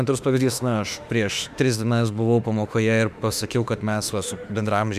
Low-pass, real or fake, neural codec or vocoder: 14.4 kHz; fake; autoencoder, 48 kHz, 32 numbers a frame, DAC-VAE, trained on Japanese speech